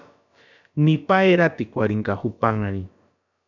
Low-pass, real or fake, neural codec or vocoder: 7.2 kHz; fake; codec, 16 kHz, about 1 kbps, DyCAST, with the encoder's durations